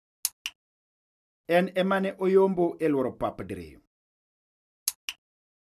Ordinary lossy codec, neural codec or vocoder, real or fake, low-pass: none; none; real; 14.4 kHz